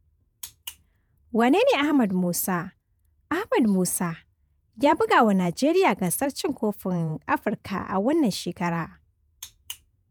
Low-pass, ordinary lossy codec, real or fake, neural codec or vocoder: none; none; fake; vocoder, 48 kHz, 128 mel bands, Vocos